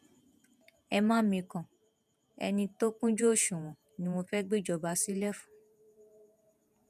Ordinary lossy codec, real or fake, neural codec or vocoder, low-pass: none; fake; vocoder, 48 kHz, 128 mel bands, Vocos; 14.4 kHz